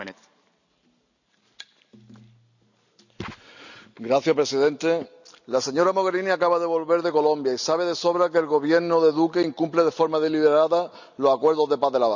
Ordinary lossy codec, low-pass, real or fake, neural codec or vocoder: none; 7.2 kHz; real; none